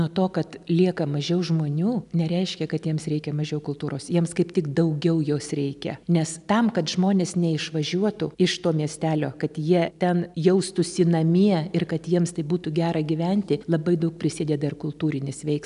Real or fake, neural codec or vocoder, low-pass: real; none; 10.8 kHz